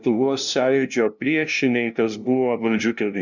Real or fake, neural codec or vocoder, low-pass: fake; codec, 16 kHz, 0.5 kbps, FunCodec, trained on LibriTTS, 25 frames a second; 7.2 kHz